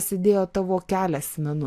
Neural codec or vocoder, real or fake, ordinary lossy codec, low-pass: none; real; AAC, 64 kbps; 14.4 kHz